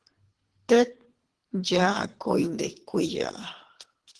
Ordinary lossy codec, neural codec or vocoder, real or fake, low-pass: Opus, 16 kbps; codec, 24 kHz, 3 kbps, HILCodec; fake; 10.8 kHz